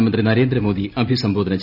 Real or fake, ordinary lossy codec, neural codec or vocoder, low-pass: real; none; none; 5.4 kHz